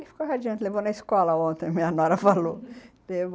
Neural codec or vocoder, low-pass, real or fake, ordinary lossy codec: none; none; real; none